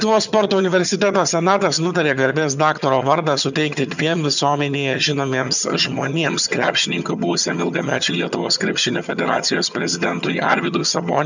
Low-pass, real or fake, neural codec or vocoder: 7.2 kHz; fake; vocoder, 22.05 kHz, 80 mel bands, HiFi-GAN